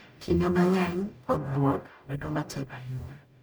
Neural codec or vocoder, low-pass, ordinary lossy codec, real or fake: codec, 44.1 kHz, 0.9 kbps, DAC; none; none; fake